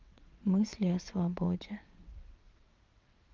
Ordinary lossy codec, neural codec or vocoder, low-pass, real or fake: Opus, 32 kbps; vocoder, 22.05 kHz, 80 mel bands, WaveNeXt; 7.2 kHz; fake